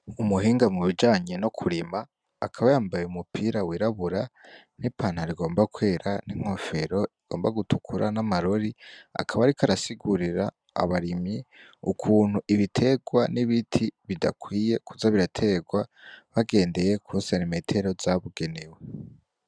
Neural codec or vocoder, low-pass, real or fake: none; 9.9 kHz; real